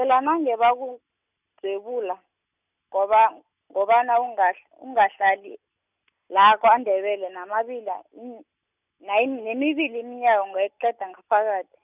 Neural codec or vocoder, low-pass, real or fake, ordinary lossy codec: none; 3.6 kHz; real; none